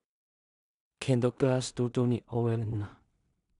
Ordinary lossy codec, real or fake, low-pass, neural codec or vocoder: none; fake; 10.8 kHz; codec, 16 kHz in and 24 kHz out, 0.4 kbps, LongCat-Audio-Codec, two codebook decoder